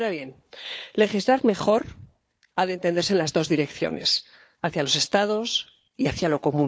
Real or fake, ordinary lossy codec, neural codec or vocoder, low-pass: fake; none; codec, 16 kHz, 4 kbps, FunCodec, trained on Chinese and English, 50 frames a second; none